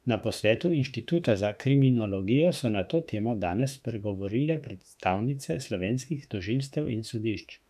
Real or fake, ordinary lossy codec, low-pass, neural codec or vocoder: fake; none; 14.4 kHz; autoencoder, 48 kHz, 32 numbers a frame, DAC-VAE, trained on Japanese speech